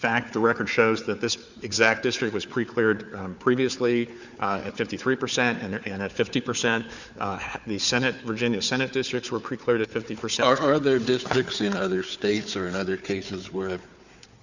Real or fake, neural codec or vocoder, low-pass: fake; codec, 16 kHz, 4 kbps, FunCodec, trained on Chinese and English, 50 frames a second; 7.2 kHz